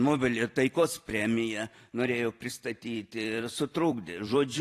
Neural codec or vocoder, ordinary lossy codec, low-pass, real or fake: vocoder, 44.1 kHz, 128 mel bands every 256 samples, BigVGAN v2; AAC, 48 kbps; 14.4 kHz; fake